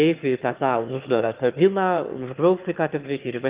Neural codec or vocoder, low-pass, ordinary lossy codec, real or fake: autoencoder, 22.05 kHz, a latent of 192 numbers a frame, VITS, trained on one speaker; 3.6 kHz; Opus, 32 kbps; fake